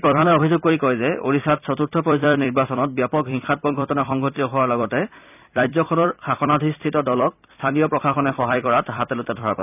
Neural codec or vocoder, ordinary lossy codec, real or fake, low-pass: vocoder, 44.1 kHz, 128 mel bands every 256 samples, BigVGAN v2; none; fake; 3.6 kHz